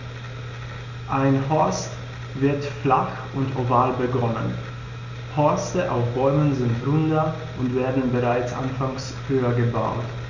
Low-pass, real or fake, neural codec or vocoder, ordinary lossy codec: 7.2 kHz; real; none; Opus, 64 kbps